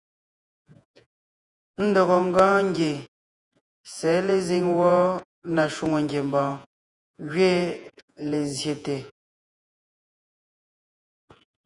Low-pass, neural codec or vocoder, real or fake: 10.8 kHz; vocoder, 48 kHz, 128 mel bands, Vocos; fake